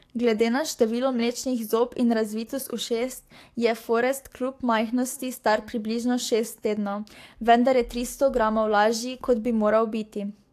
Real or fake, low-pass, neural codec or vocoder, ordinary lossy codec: fake; 14.4 kHz; codec, 44.1 kHz, 7.8 kbps, DAC; AAC, 64 kbps